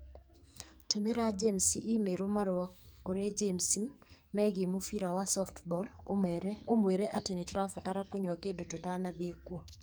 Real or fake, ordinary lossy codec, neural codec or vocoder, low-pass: fake; none; codec, 44.1 kHz, 2.6 kbps, SNAC; none